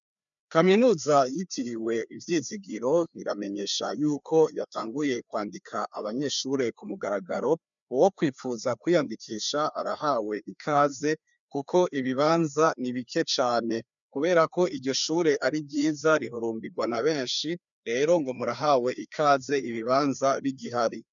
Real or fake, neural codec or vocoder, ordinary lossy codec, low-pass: fake; codec, 16 kHz, 2 kbps, FreqCodec, larger model; MP3, 96 kbps; 7.2 kHz